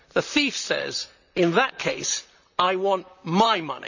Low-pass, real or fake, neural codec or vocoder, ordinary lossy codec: 7.2 kHz; fake; vocoder, 44.1 kHz, 128 mel bands, Pupu-Vocoder; none